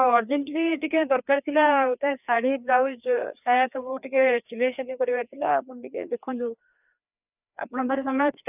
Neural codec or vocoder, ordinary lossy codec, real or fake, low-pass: codec, 16 kHz, 2 kbps, FreqCodec, larger model; none; fake; 3.6 kHz